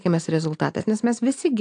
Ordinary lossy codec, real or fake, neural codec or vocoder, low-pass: AAC, 64 kbps; real; none; 9.9 kHz